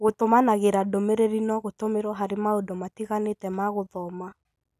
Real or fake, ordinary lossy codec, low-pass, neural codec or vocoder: real; none; 14.4 kHz; none